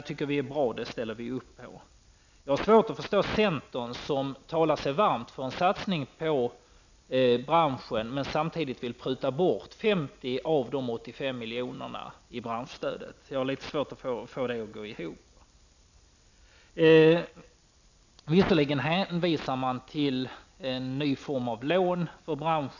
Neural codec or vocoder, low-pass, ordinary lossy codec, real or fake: none; 7.2 kHz; none; real